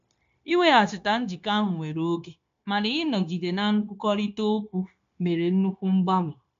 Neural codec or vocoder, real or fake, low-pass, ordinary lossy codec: codec, 16 kHz, 0.9 kbps, LongCat-Audio-Codec; fake; 7.2 kHz; AAC, 64 kbps